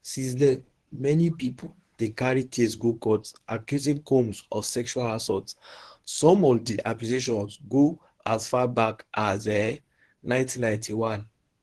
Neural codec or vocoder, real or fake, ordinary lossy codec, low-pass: codec, 24 kHz, 0.9 kbps, WavTokenizer, medium speech release version 1; fake; Opus, 16 kbps; 10.8 kHz